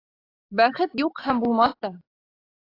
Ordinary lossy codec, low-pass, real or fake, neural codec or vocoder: AAC, 24 kbps; 5.4 kHz; real; none